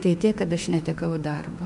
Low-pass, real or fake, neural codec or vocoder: 10.8 kHz; fake; autoencoder, 48 kHz, 32 numbers a frame, DAC-VAE, trained on Japanese speech